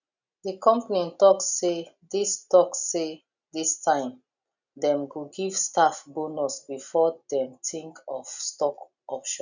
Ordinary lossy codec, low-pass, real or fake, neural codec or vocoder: none; 7.2 kHz; real; none